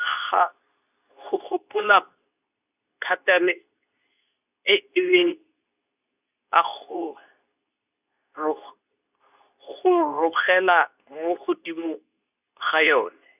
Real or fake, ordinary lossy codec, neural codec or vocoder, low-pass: fake; none; codec, 24 kHz, 0.9 kbps, WavTokenizer, medium speech release version 2; 3.6 kHz